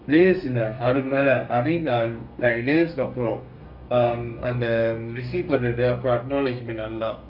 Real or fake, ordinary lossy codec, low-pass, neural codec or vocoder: fake; none; 5.4 kHz; codec, 32 kHz, 1.9 kbps, SNAC